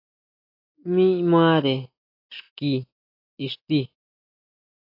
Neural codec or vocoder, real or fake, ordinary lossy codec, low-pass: none; real; AAC, 32 kbps; 5.4 kHz